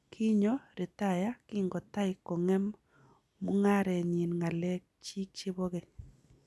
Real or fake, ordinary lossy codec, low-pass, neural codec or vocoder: real; none; none; none